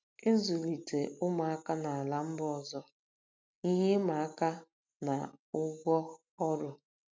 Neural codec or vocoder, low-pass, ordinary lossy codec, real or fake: none; none; none; real